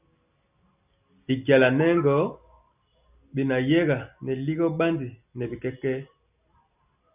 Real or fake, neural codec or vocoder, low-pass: real; none; 3.6 kHz